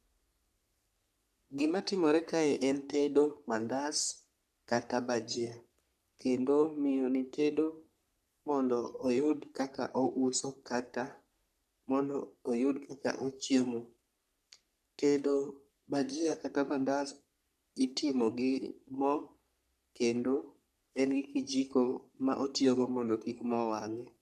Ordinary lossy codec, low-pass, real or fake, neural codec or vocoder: none; 14.4 kHz; fake; codec, 44.1 kHz, 3.4 kbps, Pupu-Codec